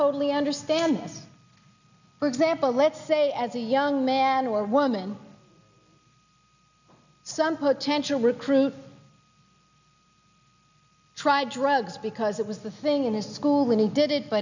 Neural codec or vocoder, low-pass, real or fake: none; 7.2 kHz; real